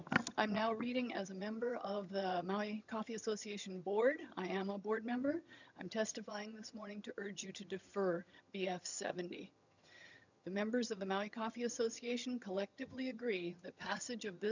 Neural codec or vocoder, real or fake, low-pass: vocoder, 22.05 kHz, 80 mel bands, HiFi-GAN; fake; 7.2 kHz